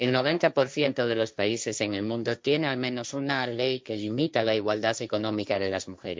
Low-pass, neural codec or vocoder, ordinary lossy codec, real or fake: none; codec, 16 kHz, 1.1 kbps, Voila-Tokenizer; none; fake